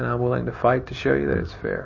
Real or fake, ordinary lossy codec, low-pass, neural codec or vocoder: real; MP3, 32 kbps; 7.2 kHz; none